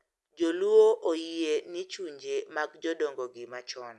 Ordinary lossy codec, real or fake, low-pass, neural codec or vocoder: none; real; none; none